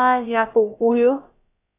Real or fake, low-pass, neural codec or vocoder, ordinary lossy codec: fake; 3.6 kHz; codec, 16 kHz, about 1 kbps, DyCAST, with the encoder's durations; AAC, 24 kbps